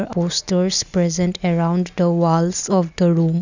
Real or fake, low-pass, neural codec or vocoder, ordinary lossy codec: real; 7.2 kHz; none; none